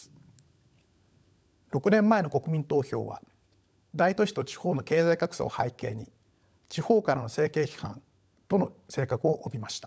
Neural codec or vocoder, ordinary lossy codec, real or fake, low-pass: codec, 16 kHz, 16 kbps, FunCodec, trained on LibriTTS, 50 frames a second; none; fake; none